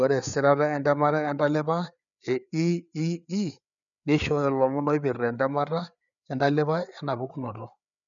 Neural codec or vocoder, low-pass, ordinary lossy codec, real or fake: codec, 16 kHz, 4 kbps, FreqCodec, larger model; 7.2 kHz; none; fake